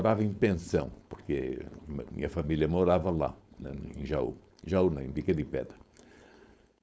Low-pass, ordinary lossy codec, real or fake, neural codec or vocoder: none; none; fake; codec, 16 kHz, 4.8 kbps, FACodec